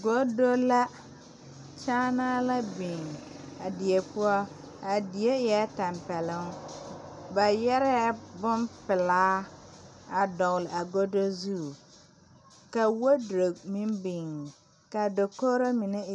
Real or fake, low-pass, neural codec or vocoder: real; 10.8 kHz; none